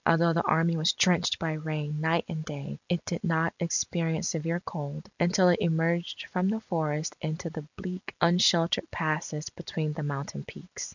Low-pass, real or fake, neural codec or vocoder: 7.2 kHz; real; none